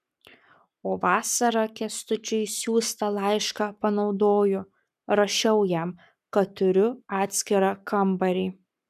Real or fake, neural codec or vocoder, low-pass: fake; codec, 44.1 kHz, 7.8 kbps, Pupu-Codec; 14.4 kHz